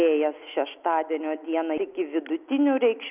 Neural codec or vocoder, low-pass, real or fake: none; 3.6 kHz; real